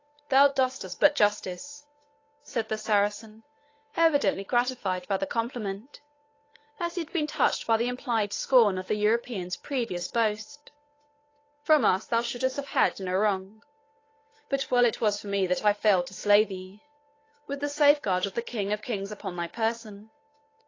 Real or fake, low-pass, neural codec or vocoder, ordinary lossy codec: fake; 7.2 kHz; codec, 16 kHz, 8 kbps, FunCodec, trained on Chinese and English, 25 frames a second; AAC, 32 kbps